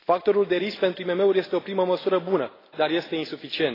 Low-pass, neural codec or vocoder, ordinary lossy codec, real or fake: 5.4 kHz; none; AAC, 24 kbps; real